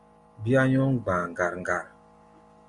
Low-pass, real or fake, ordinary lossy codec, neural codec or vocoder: 10.8 kHz; real; MP3, 64 kbps; none